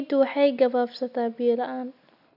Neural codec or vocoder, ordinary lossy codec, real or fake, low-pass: none; none; real; 5.4 kHz